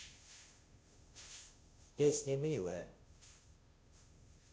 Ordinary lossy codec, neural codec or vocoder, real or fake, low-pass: none; codec, 16 kHz, 0.5 kbps, FunCodec, trained on Chinese and English, 25 frames a second; fake; none